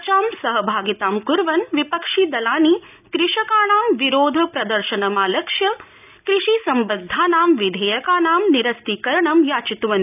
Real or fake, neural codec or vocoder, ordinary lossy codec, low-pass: real; none; none; 3.6 kHz